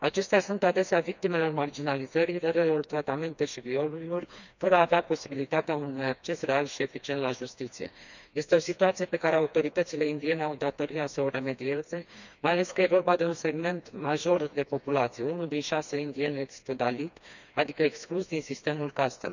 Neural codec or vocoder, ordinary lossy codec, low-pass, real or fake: codec, 16 kHz, 2 kbps, FreqCodec, smaller model; none; 7.2 kHz; fake